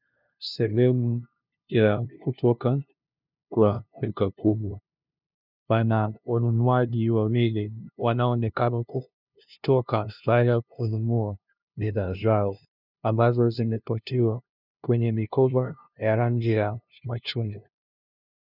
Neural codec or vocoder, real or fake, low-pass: codec, 16 kHz, 0.5 kbps, FunCodec, trained on LibriTTS, 25 frames a second; fake; 5.4 kHz